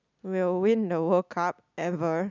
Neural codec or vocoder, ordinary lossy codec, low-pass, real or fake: none; none; 7.2 kHz; real